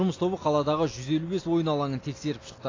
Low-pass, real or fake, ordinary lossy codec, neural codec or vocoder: 7.2 kHz; real; AAC, 32 kbps; none